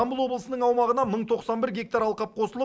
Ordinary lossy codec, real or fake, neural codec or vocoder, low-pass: none; real; none; none